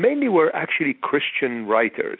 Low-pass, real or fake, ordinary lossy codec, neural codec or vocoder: 5.4 kHz; real; AAC, 48 kbps; none